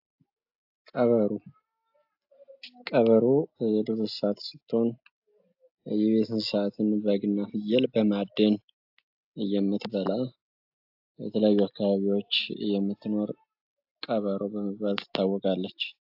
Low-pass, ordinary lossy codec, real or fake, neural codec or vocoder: 5.4 kHz; AAC, 48 kbps; real; none